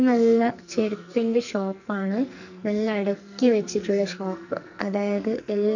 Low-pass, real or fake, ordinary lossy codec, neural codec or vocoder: 7.2 kHz; fake; none; codec, 44.1 kHz, 2.6 kbps, SNAC